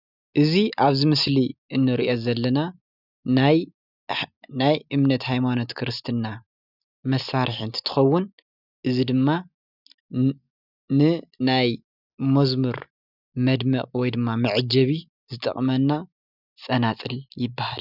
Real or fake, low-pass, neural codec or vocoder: real; 5.4 kHz; none